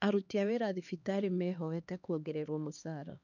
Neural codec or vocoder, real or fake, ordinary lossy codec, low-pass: codec, 16 kHz, 2 kbps, X-Codec, HuBERT features, trained on LibriSpeech; fake; none; 7.2 kHz